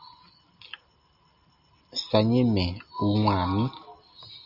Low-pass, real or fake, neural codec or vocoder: 5.4 kHz; real; none